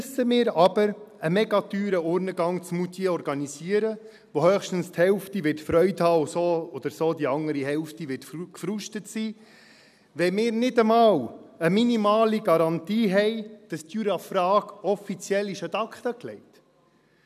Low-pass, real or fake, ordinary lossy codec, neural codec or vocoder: 14.4 kHz; real; none; none